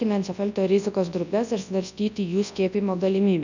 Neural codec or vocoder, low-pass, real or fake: codec, 24 kHz, 0.9 kbps, WavTokenizer, large speech release; 7.2 kHz; fake